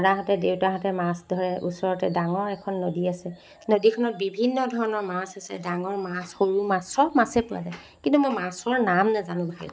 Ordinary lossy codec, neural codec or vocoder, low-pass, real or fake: none; none; none; real